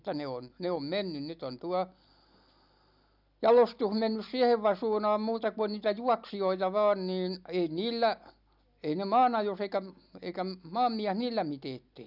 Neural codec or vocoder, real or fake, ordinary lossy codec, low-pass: none; real; none; 5.4 kHz